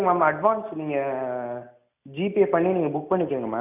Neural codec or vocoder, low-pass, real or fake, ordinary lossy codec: none; 3.6 kHz; real; none